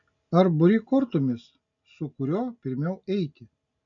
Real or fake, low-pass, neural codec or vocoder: real; 7.2 kHz; none